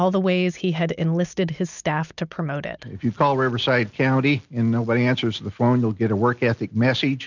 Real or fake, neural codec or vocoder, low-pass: real; none; 7.2 kHz